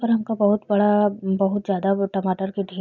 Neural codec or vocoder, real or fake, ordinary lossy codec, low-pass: none; real; none; 7.2 kHz